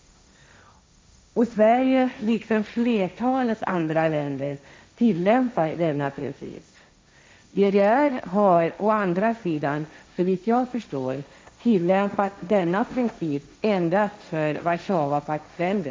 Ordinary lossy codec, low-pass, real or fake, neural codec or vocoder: none; none; fake; codec, 16 kHz, 1.1 kbps, Voila-Tokenizer